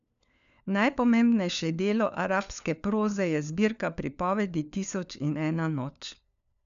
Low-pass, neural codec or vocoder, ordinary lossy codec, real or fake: 7.2 kHz; codec, 16 kHz, 4 kbps, FunCodec, trained on LibriTTS, 50 frames a second; none; fake